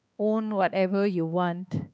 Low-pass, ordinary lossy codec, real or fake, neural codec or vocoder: none; none; fake; codec, 16 kHz, 4 kbps, X-Codec, HuBERT features, trained on balanced general audio